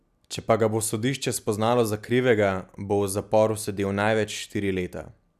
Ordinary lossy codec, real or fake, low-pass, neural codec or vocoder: none; real; 14.4 kHz; none